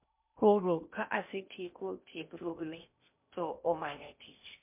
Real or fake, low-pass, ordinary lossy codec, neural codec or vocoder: fake; 3.6 kHz; MP3, 32 kbps; codec, 16 kHz in and 24 kHz out, 0.8 kbps, FocalCodec, streaming, 65536 codes